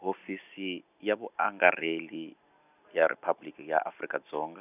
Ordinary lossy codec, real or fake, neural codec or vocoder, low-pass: none; real; none; 3.6 kHz